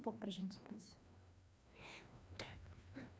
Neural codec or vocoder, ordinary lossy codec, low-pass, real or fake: codec, 16 kHz, 1 kbps, FreqCodec, larger model; none; none; fake